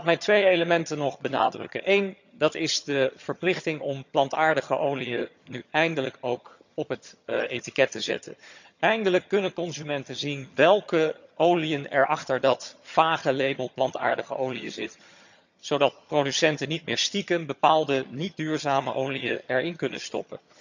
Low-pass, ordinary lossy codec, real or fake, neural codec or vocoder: 7.2 kHz; none; fake; vocoder, 22.05 kHz, 80 mel bands, HiFi-GAN